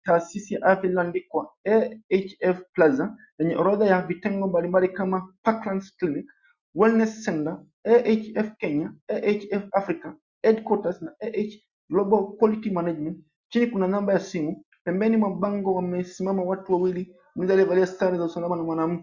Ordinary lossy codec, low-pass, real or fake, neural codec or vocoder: Opus, 64 kbps; 7.2 kHz; real; none